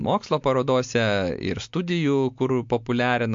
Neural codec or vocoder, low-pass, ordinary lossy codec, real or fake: none; 7.2 kHz; MP3, 48 kbps; real